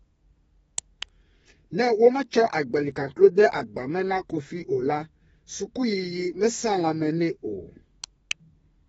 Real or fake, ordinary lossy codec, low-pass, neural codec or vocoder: fake; AAC, 24 kbps; 14.4 kHz; codec, 32 kHz, 1.9 kbps, SNAC